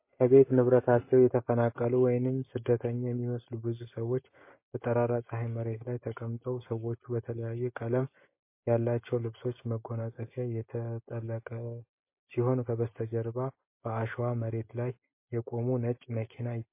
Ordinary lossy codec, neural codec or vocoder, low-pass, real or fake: MP3, 24 kbps; none; 3.6 kHz; real